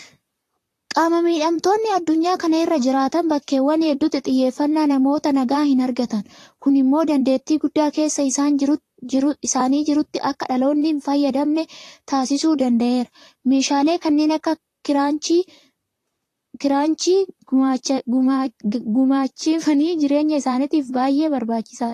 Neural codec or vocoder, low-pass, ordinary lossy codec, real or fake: vocoder, 44.1 kHz, 128 mel bands, Pupu-Vocoder; 14.4 kHz; AAC, 48 kbps; fake